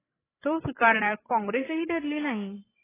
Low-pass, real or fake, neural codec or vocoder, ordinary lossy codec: 3.6 kHz; fake; codec, 16 kHz, 16 kbps, FreqCodec, larger model; AAC, 16 kbps